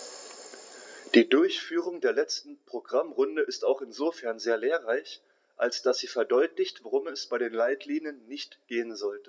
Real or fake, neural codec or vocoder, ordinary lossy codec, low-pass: real; none; none; none